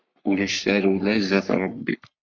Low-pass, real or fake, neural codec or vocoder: 7.2 kHz; fake; codec, 44.1 kHz, 3.4 kbps, Pupu-Codec